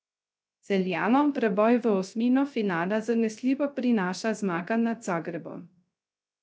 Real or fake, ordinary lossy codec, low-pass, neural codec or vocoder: fake; none; none; codec, 16 kHz, 0.3 kbps, FocalCodec